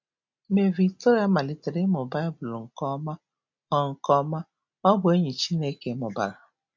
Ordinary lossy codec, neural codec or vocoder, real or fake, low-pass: MP3, 48 kbps; none; real; 7.2 kHz